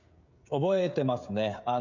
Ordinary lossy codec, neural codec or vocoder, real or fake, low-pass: none; codec, 16 kHz, 16 kbps, FreqCodec, smaller model; fake; 7.2 kHz